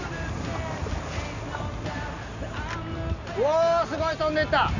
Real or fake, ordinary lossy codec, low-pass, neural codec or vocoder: real; none; 7.2 kHz; none